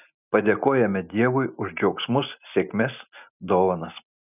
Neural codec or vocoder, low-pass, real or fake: none; 3.6 kHz; real